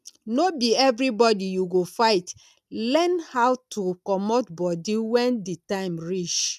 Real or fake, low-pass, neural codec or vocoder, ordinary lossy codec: real; 14.4 kHz; none; none